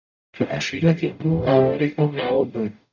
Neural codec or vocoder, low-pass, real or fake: codec, 44.1 kHz, 0.9 kbps, DAC; 7.2 kHz; fake